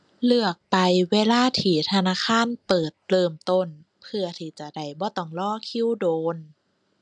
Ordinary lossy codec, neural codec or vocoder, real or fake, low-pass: none; none; real; 10.8 kHz